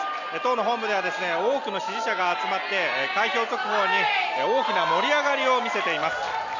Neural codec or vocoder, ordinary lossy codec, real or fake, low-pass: none; none; real; 7.2 kHz